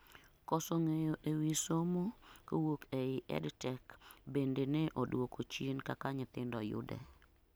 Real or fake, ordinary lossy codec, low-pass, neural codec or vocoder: real; none; none; none